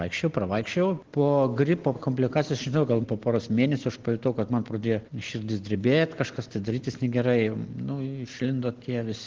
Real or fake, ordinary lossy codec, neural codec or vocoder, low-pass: real; Opus, 16 kbps; none; 7.2 kHz